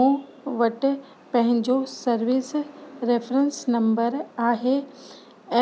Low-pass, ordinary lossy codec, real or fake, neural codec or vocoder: none; none; real; none